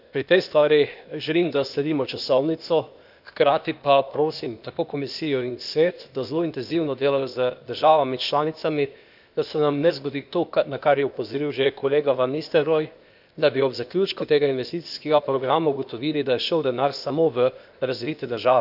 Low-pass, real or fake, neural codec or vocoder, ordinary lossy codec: 5.4 kHz; fake; codec, 16 kHz, 0.8 kbps, ZipCodec; none